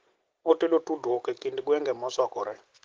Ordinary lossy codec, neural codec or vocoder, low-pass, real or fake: Opus, 16 kbps; none; 7.2 kHz; real